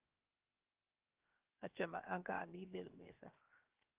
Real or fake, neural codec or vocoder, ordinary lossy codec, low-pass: fake; codec, 16 kHz, 0.7 kbps, FocalCodec; Opus, 32 kbps; 3.6 kHz